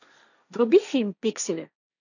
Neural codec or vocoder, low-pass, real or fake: codec, 16 kHz, 1.1 kbps, Voila-Tokenizer; 7.2 kHz; fake